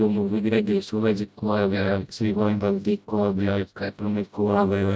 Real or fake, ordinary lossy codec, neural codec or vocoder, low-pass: fake; none; codec, 16 kHz, 0.5 kbps, FreqCodec, smaller model; none